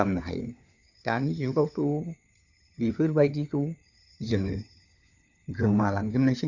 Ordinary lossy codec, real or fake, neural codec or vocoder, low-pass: none; fake; codec, 16 kHz, 4 kbps, FunCodec, trained on LibriTTS, 50 frames a second; 7.2 kHz